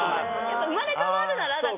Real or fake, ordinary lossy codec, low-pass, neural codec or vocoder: real; MP3, 24 kbps; 3.6 kHz; none